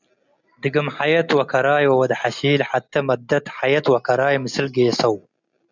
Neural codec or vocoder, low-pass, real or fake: none; 7.2 kHz; real